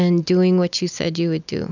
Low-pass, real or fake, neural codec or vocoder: 7.2 kHz; real; none